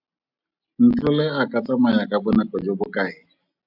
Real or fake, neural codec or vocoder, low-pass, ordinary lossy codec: real; none; 5.4 kHz; AAC, 48 kbps